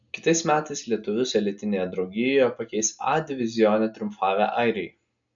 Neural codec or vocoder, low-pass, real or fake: none; 7.2 kHz; real